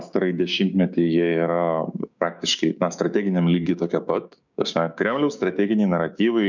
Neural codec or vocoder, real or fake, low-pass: codec, 16 kHz, 6 kbps, DAC; fake; 7.2 kHz